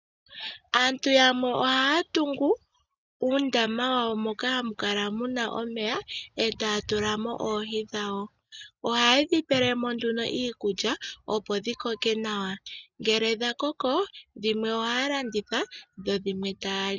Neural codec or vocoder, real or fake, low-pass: none; real; 7.2 kHz